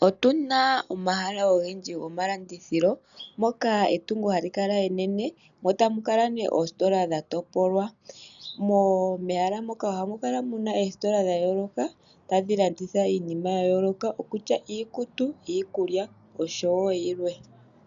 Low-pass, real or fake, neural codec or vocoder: 7.2 kHz; real; none